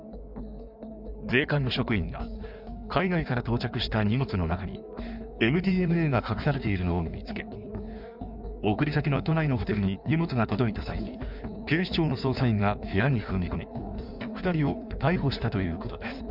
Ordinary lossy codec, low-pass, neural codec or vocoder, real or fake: none; 5.4 kHz; codec, 16 kHz in and 24 kHz out, 1.1 kbps, FireRedTTS-2 codec; fake